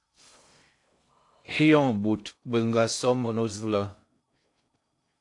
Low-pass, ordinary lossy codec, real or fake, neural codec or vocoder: 10.8 kHz; AAC, 64 kbps; fake; codec, 16 kHz in and 24 kHz out, 0.6 kbps, FocalCodec, streaming, 2048 codes